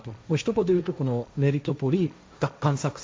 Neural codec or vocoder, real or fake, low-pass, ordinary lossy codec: codec, 16 kHz, 1.1 kbps, Voila-Tokenizer; fake; none; none